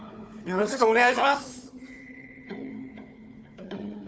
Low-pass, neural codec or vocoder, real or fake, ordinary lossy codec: none; codec, 16 kHz, 4 kbps, FunCodec, trained on Chinese and English, 50 frames a second; fake; none